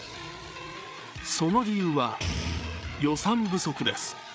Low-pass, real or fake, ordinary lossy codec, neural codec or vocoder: none; fake; none; codec, 16 kHz, 8 kbps, FreqCodec, larger model